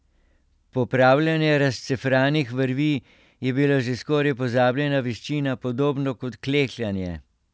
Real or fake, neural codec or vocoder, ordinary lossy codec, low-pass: real; none; none; none